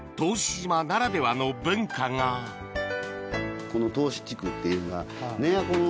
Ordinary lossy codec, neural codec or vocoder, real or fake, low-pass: none; none; real; none